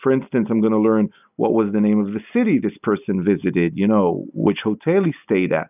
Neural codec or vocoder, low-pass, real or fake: none; 3.6 kHz; real